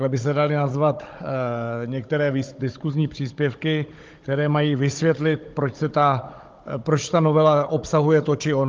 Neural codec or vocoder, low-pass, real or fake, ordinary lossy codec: codec, 16 kHz, 16 kbps, FunCodec, trained on Chinese and English, 50 frames a second; 7.2 kHz; fake; Opus, 32 kbps